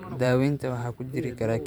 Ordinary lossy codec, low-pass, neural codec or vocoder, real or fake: none; none; vocoder, 44.1 kHz, 128 mel bands every 512 samples, BigVGAN v2; fake